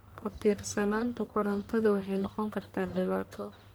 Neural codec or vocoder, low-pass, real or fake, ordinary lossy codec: codec, 44.1 kHz, 1.7 kbps, Pupu-Codec; none; fake; none